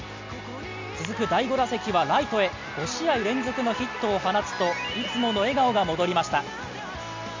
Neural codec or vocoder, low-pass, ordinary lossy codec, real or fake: none; 7.2 kHz; MP3, 64 kbps; real